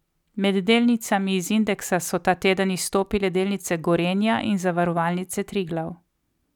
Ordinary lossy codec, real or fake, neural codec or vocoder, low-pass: none; real; none; 19.8 kHz